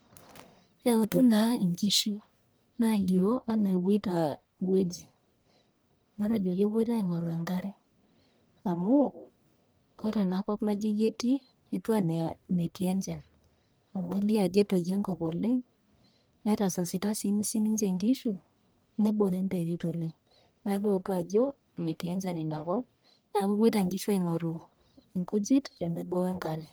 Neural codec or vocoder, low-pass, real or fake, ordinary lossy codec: codec, 44.1 kHz, 1.7 kbps, Pupu-Codec; none; fake; none